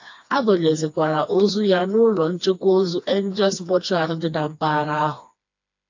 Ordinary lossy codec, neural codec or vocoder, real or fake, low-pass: AAC, 48 kbps; codec, 16 kHz, 2 kbps, FreqCodec, smaller model; fake; 7.2 kHz